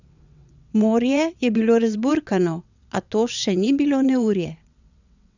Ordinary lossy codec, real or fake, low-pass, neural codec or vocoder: none; fake; 7.2 kHz; vocoder, 22.05 kHz, 80 mel bands, WaveNeXt